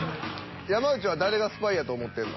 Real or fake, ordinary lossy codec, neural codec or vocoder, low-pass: real; MP3, 24 kbps; none; 7.2 kHz